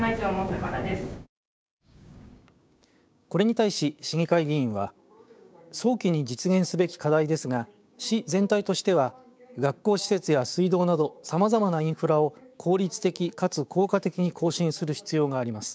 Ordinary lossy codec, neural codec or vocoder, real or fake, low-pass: none; codec, 16 kHz, 6 kbps, DAC; fake; none